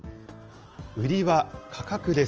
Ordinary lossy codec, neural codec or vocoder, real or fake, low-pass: Opus, 24 kbps; none; real; 7.2 kHz